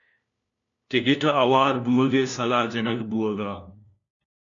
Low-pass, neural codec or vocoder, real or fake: 7.2 kHz; codec, 16 kHz, 1 kbps, FunCodec, trained on LibriTTS, 50 frames a second; fake